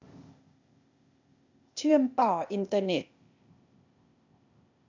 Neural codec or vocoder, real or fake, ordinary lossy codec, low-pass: codec, 16 kHz, 0.8 kbps, ZipCodec; fake; MP3, 64 kbps; 7.2 kHz